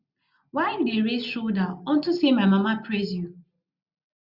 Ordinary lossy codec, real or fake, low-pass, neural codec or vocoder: Opus, 64 kbps; real; 5.4 kHz; none